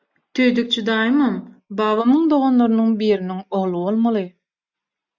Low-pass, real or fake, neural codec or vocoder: 7.2 kHz; real; none